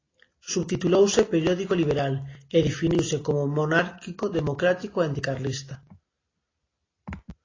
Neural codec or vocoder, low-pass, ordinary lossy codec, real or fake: none; 7.2 kHz; AAC, 32 kbps; real